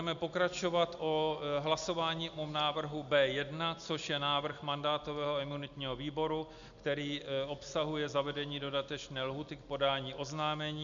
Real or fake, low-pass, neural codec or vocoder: real; 7.2 kHz; none